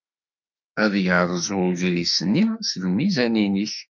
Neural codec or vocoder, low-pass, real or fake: autoencoder, 48 kHz, 32 numbers a frame, DAC-VAE, trained on Japanese speech; 7.2 kHz; fake